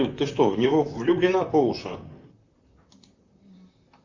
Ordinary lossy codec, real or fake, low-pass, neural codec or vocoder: Opus, 64 kbps; fake; 7.2 kHz; vocoder, 44.1 kHz, 128 mel bands, Pupu-Vocoder